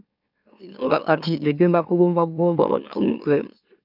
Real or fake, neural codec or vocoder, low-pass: fake; autoencoder, 44.1 kHz, a latent of 192 numbers a frame, MeloTTS; 5.4 kHz